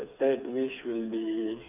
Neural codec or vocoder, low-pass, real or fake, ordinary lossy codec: codec, 16 kHz, 4 kbps, FreqCodec, smaller model; 3.6 kHz; fake; none